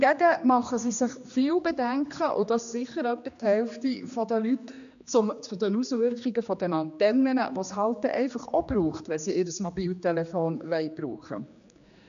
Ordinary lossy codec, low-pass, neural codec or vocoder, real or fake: AAC, 96 kbps; 7.2 kHz; codec, 16 kHz, 2 kbps, X-Codec, HuBERT features, trained on general audio; fake